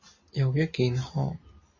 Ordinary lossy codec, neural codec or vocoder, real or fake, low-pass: MP3, 32 kbps; none; real; 7.2 kHz